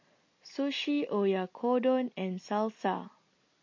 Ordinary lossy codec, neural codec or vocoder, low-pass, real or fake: MP3, 32 kbps; none; 7.2 kHz; real